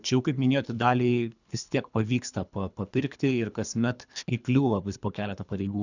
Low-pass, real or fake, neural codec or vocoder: 7.2 kHz; fake; codec, 24 kHz, 3 kbps, HILCodec